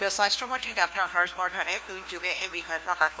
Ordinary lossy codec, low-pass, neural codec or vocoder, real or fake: none; none; codec, 16 kHz, 1 kbps, FunCodec, trained on LibriTTS, 50 frames a second; fake